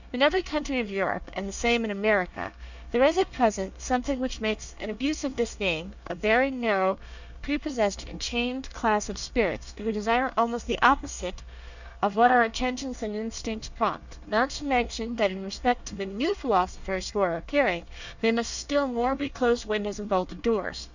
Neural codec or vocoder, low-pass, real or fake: codec, 24 kHz, 1 kbps, SNAC; 7.2 kHz; fake